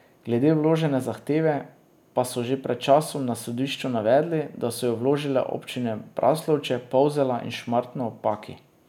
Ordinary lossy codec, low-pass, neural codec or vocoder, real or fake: none; 19.8 kHz; none; real